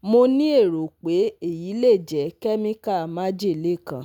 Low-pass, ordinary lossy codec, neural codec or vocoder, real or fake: 19.8 kHz; none; none; real